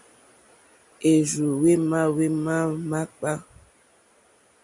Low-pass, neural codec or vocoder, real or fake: 10.8 kHz; none; real